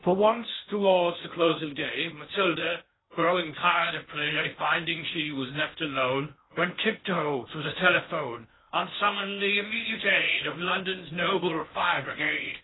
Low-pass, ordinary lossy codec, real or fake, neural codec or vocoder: 7.2 kHz; AAC, 16 kbps; fake; codec, 16 kHz in and 24 kHz out, 0.6 kbps, FocalCodec, streaming, 2048 codes